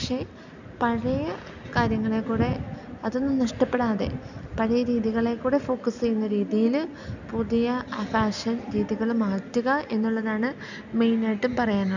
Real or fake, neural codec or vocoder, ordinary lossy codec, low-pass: real; none; none; 7.2 kHz